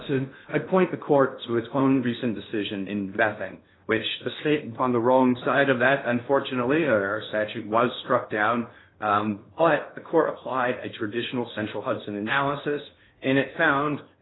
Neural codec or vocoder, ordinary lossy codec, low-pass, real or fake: codec, 16 kHz in and 24 kHz out, 0.6 kbps, FocalCodec, streaming, 2048 codes; AAC, 16 kbps; 7.2 kHz; fake